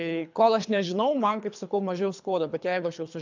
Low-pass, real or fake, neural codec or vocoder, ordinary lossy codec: 7.2 kHz; fake; codec, 24 kHz, 3 kbps, HILCodec; MP3, 64 kbps